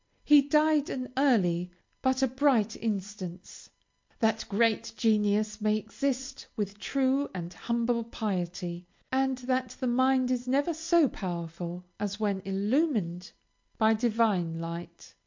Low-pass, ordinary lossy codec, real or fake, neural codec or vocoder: 7.2 kHz; MP3, 48 kbps; real; none